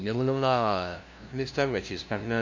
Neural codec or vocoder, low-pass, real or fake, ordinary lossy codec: codec, 16 kHz, 0.5 kbps, FunCodec, trained on LibriTTS, 25 frames a second; 7.2 kHz; fake; MP3, 64 kbps